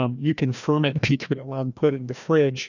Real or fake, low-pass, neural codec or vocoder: fake; 7.2 kHz; codec, 16 kHz, 1 kbps, FreqCodec, larger model